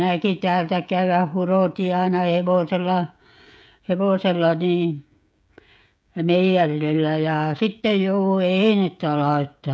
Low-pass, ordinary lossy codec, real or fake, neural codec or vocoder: none; none; fake; codec, 16 kHz, 16 kbps, FreqCodec, smaller model